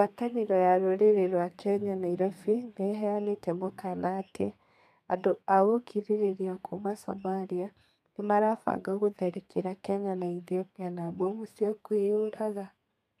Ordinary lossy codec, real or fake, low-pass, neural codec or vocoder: none; fake; 14.4 kHz; codec, 32 kHz, 1.9 kbps, SNAC